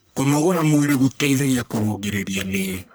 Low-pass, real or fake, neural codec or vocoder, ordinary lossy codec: none; fake; codec, 44.1 kHz, 1.7 kbps, Pupu-Codec; none